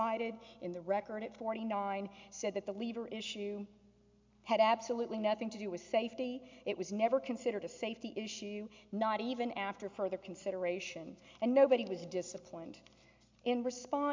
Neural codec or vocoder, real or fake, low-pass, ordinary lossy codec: none; real; 7.2 kHz; MP3, 64 kbps